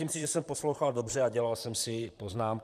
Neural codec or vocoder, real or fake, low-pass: vocoder, 44.1 kHz, 128 mel bands, Pupu-Vocoder; fake; 14.4 kHz